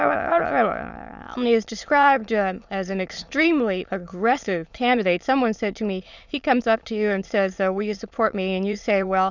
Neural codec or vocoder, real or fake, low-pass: autoencoder, 22.05 kHz, a latent of 192 numbers a frame, VITS, trained on many speakers; fake; 7.2 kHz